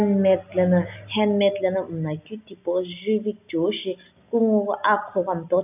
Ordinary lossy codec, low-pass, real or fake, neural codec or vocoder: none; 3.6 kHz; real; none